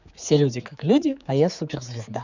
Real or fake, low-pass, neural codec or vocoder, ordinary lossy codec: fake; 7.2 kHz; codec, 16 kHz, 4 kbps, X-Codec, HuBERT features, trained on general audio; none